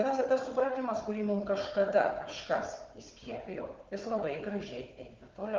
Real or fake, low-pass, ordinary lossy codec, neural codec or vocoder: fake; 7.2 kHz; Opus, 32 kbps; codec, 16 kHz, 4 kbps, FunCodec, trained on Chinese and English, 50 frames a second